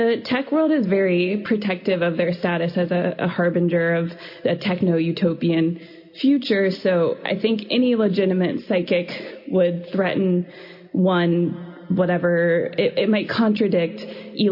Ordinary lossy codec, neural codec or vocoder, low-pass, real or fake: MP3, 32 kbps; none; 5.4 kHz; real